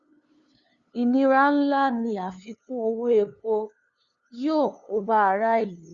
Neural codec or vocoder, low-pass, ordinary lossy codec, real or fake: codec, 16 kHz, 2 kbps, FunCodec, trained on LibriTTS, 25 frames a second; 7.2 kHz; Opus, 64 kbps; fake